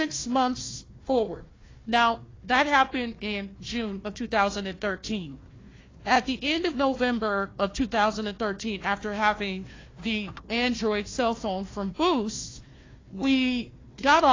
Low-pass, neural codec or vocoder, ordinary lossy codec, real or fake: 7.2 kHz; codec, 16 kHz, 1 kbps, FunCodec, trained on Chinese and English, 50 frames a second; AAC, 32 kbps; fake